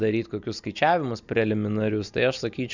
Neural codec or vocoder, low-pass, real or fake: none; 7.2 kHz; real